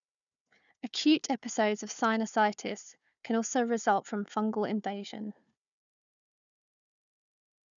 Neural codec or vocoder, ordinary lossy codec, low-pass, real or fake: codec, 16 kHz, 4 kbps, FunCodec, trained on Chinese and English, 50 frames a second; none; 7.2 kHz; fake